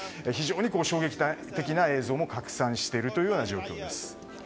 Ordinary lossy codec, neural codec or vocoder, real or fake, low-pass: none; none; real; none